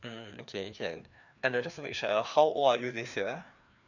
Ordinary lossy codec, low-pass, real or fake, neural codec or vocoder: none; 7.2 kHz; fake; codec, 16 kHz, 2 kbps, FreqCodec, larger model